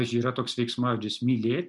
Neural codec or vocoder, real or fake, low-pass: none; real; 10.8 kHz